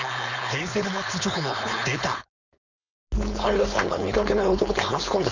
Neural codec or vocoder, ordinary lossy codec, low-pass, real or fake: codec, 16 kHz, 4.8 kbps, FACodec; none; 7.2 kHz; fake